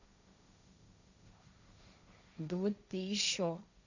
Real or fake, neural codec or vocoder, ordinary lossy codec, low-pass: fake; codec, 16 kHz, 1.1 kbps, Voila-Tokenizer; Opus, 64 kbps; 7.2 kHz